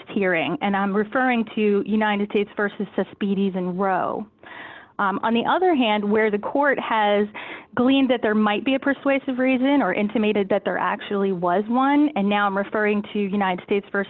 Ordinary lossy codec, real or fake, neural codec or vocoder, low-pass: Opus, 32 kbps; real; none; 7.2 kHz